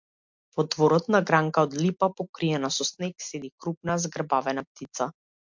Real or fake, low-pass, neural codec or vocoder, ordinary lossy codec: real; 7.2 kHz; none; MP3, 64 kbps